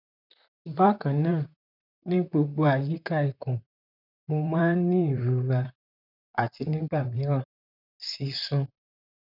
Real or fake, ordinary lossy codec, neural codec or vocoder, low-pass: fake; none; vocoder, 44.1 kHz, 128 mel bands, Pupu-Vocoder; 5.4 kHz